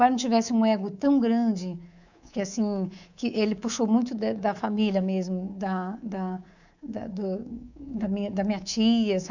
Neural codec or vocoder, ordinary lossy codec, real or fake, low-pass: codec, 24 kHz, 3.1 kbps, DualCodec; none; fake; 7.2 kHz